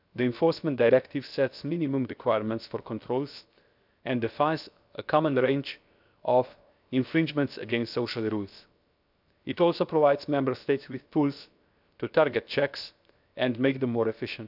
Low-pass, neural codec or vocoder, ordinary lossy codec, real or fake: 5.4 kHz; codec, 16 kHz, 0.7 kbps, FocalCodec; none; fake